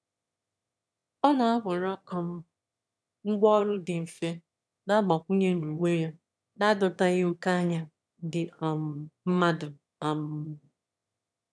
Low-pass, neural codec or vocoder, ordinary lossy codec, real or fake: none; autoencoder, 22.05 kHz, a latent of 192 numbers a frame, VITS, trained on one speaker; none; fake